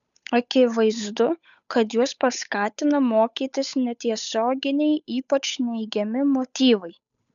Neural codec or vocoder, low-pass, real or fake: codec, 16 kHz, 8 kbps, FunCodec, trained on Chinese and English, 25 frames a second; 7.2 kHz; fake